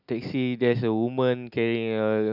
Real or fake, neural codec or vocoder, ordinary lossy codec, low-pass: real; none; none; 5.4 kHz